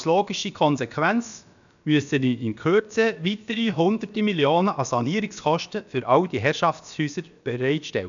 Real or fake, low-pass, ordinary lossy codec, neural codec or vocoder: fake; 7.2 kHz; none; codec, 16 kHz, about 1 kbps, DyCAST, with the encoder's durations